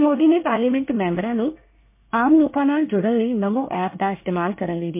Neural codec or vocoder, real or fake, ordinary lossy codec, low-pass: codec, 24 kHz, 1 kbps, SNAC; fake; MP3, 24 kbps; 3.6 kHz